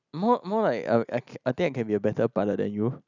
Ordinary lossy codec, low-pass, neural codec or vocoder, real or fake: none; 7.2 kHz; none; real